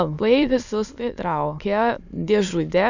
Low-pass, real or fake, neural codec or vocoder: 7.2 kHz; fake; autoencoder, 22.05 kHz, a latent of 192 numbers a frame, VITS, trained on many speakers